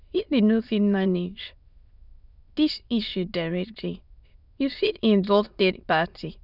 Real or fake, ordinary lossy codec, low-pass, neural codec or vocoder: fake; none; 5.4 kHz; autoencoder, 22.05 kHz, a latent of 192 numbers a frame, VITS, trained on many speakers